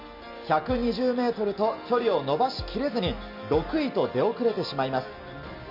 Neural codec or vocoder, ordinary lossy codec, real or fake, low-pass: none; none; real; 5.4 kHz